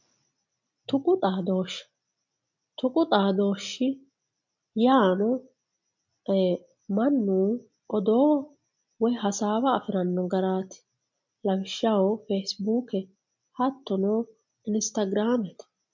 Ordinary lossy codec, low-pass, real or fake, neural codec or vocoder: MP3, 48 kbps; 7.2 kHz; real; none